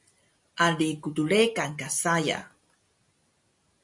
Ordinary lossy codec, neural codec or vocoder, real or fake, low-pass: MP3, 48 kbps; none; real; 10.8 kHz